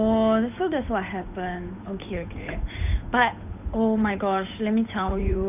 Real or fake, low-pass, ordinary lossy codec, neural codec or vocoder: fake; 3.6 kHz; none; codec, 16 kHz, 8 kbps, FunCodec, trained on Chinese and English, 25 frames a second